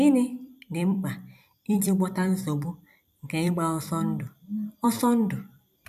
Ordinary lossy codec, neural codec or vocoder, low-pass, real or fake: none; vocoder, 44.1 kHz, 128 mel bands every 256 samples, BigVGAN v2; 14.4 kHz; fake